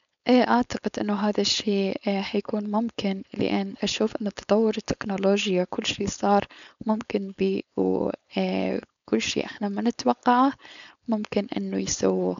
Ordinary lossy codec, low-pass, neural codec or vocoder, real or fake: MP3, 96 kbps; 7.2 kHz; codec, 16 kHz, 4.8 kbps, FACodec; fake